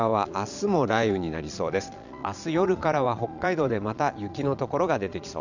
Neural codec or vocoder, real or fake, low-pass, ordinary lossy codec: vocoder, 22.05 kHz, 80 mel bands, Vocos; fake; 7.2 kHz; none